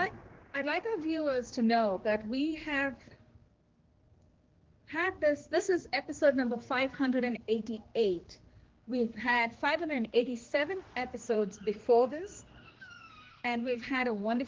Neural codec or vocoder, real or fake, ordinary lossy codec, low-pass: codec, 16 kHz, 2 kbps, X-Codec, HuBERT features, trained on general audio; fake; Opus, 16 kbps; 7.2 kHz